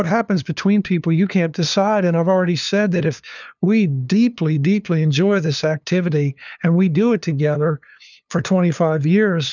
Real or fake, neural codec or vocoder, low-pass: fake; codec, 16 kHz, 2 kbps, FunCodec, trained on LibriTTS, 25 frames a second; 7.2 kHz